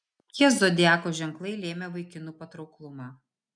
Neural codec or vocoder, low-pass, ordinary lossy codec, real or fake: none; 9.9 kHz; MP3, 96 kbps; real